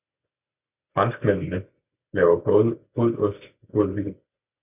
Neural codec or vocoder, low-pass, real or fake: none; 3.6 kHz; real